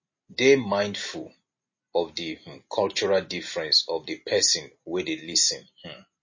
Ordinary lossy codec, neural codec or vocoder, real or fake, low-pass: MP3, 32 kbps; none; real; 7.2 kHz